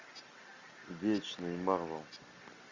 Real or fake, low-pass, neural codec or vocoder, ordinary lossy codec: real; 7.2 kHz; none; MP3, 64 kbps